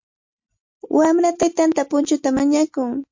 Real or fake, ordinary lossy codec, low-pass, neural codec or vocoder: real; MP3, 48 kbps; 7.2 kHz; none